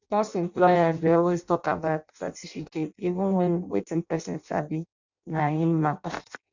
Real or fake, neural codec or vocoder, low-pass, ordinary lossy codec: fake; codec, 16 kHz in and 24 kHz out, 0.6 kbps, FireRedTTS-2 codec; 7.2 kHz; none